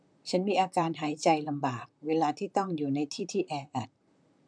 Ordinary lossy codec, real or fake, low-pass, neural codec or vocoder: none; fake; 9.9 kHz; vocoder, 44.1 kHz, 128 mel bands, Pupu-Vocoder